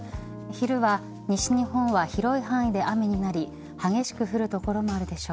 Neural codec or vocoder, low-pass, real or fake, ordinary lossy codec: none; none; real; none